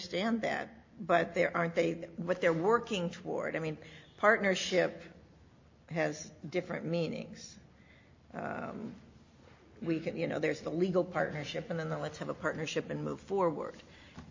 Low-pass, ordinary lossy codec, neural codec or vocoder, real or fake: 7.2 kHz; MP3, 32 kbps; none; real